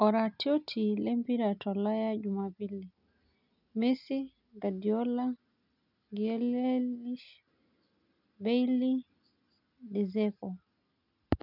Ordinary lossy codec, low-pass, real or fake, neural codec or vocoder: none; 5.4 kHz; real; none